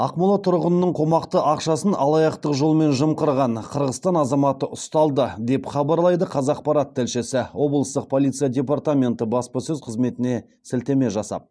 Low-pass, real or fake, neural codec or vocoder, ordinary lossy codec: none; real; none; none